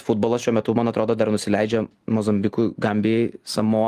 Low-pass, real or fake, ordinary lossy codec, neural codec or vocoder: 14.4 kHz; real; Opus, 24 kbps; none